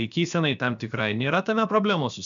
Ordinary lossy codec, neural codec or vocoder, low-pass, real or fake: MP3, 96 kbps; codec, 16 kHz, about 1 kbps, DyCAST, with the encoder's durations; 7.2 kHz; fake